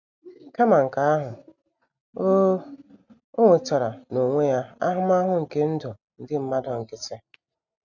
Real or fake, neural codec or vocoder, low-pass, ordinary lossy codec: real; none; 7.2 kHz; none